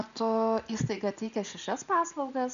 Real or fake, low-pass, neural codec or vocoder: real; 7.2 kHz; none